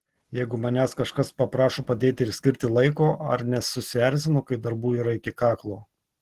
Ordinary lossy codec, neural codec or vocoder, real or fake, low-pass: Opus, 16 kbps; none; real; 14.4 kHz